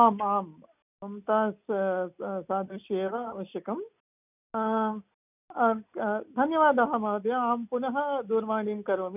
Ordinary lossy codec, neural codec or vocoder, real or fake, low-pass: none; none; real; 3.6 kHz